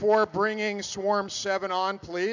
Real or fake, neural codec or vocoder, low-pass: real; none; 7.2 kHz